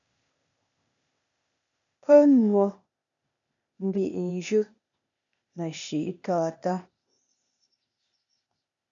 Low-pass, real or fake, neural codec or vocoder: 7.2 kHz; fake; codec, 16 kHz, 0.8 kbps, ZipCodec